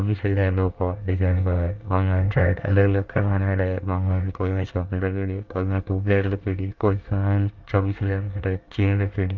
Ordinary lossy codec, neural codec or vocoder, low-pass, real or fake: Opus, 32 kbps; codec, 24 kHz, 1 kbps, SNAC; 7.2 kHz; fake